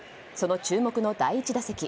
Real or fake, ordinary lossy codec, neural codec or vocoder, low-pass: real; none; none; none